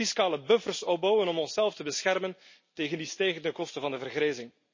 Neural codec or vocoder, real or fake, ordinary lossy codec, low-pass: none; real; MP3, 32 kbps; 7.2 kHz